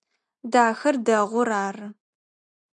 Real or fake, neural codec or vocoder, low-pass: real; none; 9.9 kHz